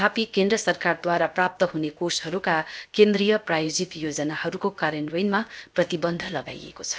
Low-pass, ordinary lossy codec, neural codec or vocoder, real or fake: none; none; codec, 16 kHz, about 1 kbps, DyCAST, with the encoder's durations; fake